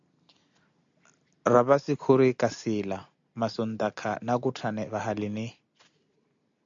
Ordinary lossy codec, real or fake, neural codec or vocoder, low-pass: AAC, 64 kbps; real; none; 7.2 kHz